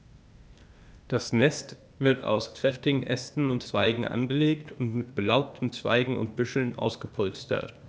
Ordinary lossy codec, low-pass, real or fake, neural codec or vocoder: none; none; fake; codec, 16 kHz, 0.8 kbps, ZipCodec